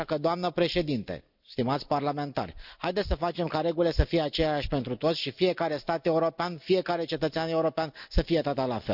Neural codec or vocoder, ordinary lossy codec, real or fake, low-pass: none; none; real; 5.4 kHz